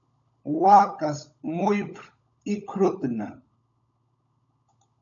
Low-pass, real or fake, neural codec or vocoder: 7.2 kHz; fake; codec, 16 kHz, 16 kbps, FunCodec, trained on LibriTTS, 50 frames a second